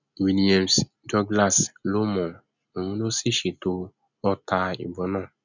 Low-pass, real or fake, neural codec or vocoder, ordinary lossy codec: 7.2 kHz; real; none; none